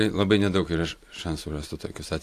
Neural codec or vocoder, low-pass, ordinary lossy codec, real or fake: none; 14.4 kHz; AAC, 64 kbps; real